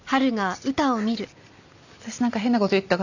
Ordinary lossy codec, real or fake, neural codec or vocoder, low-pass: none; real; none; 7.2 kHz